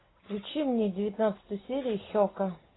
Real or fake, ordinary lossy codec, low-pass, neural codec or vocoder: real; AAC, 16 kbps; 7.2 kHz; none